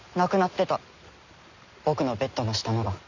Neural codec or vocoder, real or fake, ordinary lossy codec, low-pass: none; real; none; 7.2 kHz